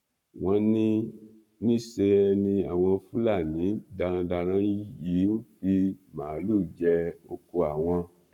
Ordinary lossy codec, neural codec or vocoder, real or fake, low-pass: none; codec, 44.1 kHz, 7.8 kbps, Pupu-Codec; fake; 19.8 kHz